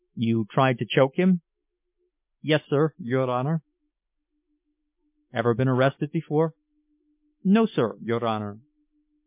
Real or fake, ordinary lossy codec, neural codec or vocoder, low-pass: real; MP3, 32 kbps; none; 3.6 kHz